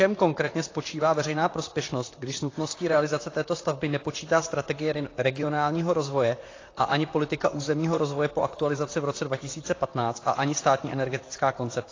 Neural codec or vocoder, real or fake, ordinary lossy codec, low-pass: vocoder, 44.1 kHz, 128 mel bands, Pupu-Vocoder; fake; AAC, 32 kbps; 7.2 kHz